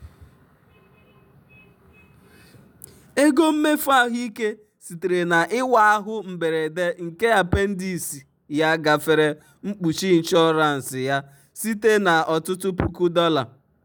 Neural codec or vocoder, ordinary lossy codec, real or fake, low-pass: none; none; real; none